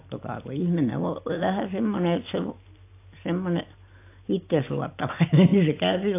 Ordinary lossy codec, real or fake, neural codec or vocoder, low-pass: AAC, 24 kbps; real; none; 3.6 kHz